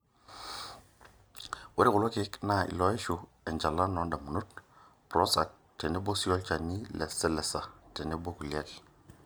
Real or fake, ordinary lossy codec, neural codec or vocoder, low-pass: real; none; none; none